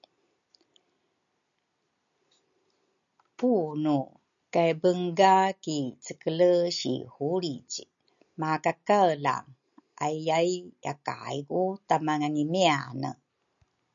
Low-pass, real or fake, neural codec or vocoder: 7.2 kHz; real; none